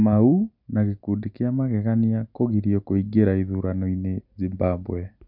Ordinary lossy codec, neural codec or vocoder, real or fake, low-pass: none; none; real; 5.4 kHz